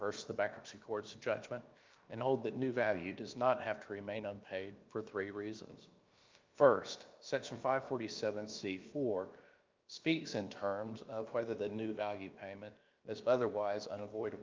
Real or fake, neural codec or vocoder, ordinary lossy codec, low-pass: fake; codec, 16 kHz, about 1 kbps, DyCAST, with the encoder's durations; Opus, 24 kbps; 7.2 kHz